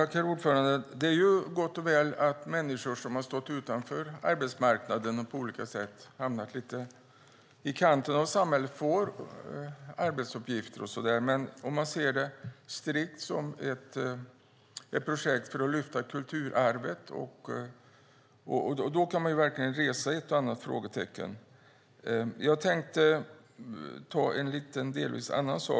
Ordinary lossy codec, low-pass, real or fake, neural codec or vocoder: none; none; real; none